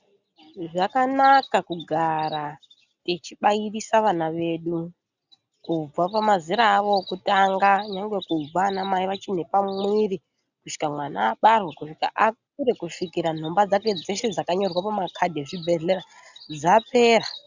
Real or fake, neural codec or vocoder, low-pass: real; none; 7.2 kHz